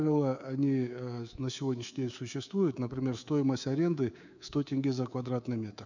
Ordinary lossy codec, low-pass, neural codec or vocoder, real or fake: none; 7.2 kHz; codec, 24 kHz, 3.1 kbps, DualCodec; fake